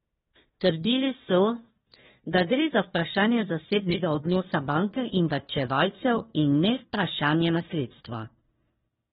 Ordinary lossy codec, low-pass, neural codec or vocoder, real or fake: AAC, 16 kbps; 7.2 kHz; codec, 16 kHz, 1 kbps, FunCodec, trained on Chinese and English, 50 frames a second; fake